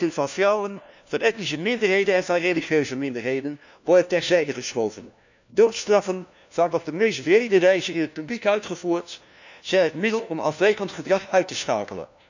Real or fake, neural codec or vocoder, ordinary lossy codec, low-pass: fake; codec, 16 kHz, 1 kbps, FunCodec, trained on LibriTTS, 50 frames a second; none; 7.2 kHz